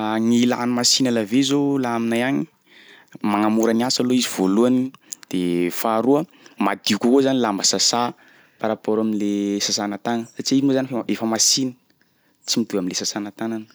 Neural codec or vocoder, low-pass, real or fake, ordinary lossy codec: none; none; real; none